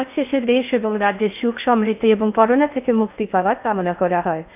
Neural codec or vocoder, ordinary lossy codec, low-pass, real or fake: codec, 16 kHz in and 24 kHz out, 0.6 kbps, FocalCodec, streaming, 4096 codes; none; 3.6 kHz; fake